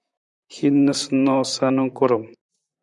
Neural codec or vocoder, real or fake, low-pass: vocoder, 44.1 kHz, 128 mel bands, Pupu-Vocoder; fake; 10.8 kHz